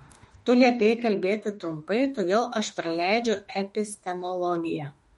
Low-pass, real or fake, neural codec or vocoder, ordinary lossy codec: 19.8 kHz; fake; autoencoder, 48 kHz, 32 numbers a frame, DAC-VAE, trained on Japanese speech; MP3, 48 kbps